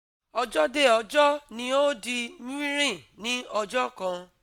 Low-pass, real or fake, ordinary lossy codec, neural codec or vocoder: 19.8 kHz; real; MP3, 96 kbps; none